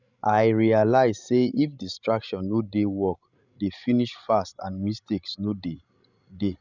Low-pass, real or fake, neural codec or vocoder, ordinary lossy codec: 7.2 kHz; fake; codec, 16 kHz, 16 kbps, FreqCodec, larger model; none